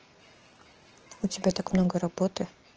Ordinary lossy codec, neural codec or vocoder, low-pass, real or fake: Opus, 16 kbps; none; 7.2 kHz; real